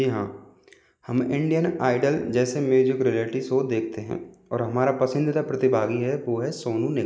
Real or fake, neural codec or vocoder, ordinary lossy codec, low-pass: real; none; none; none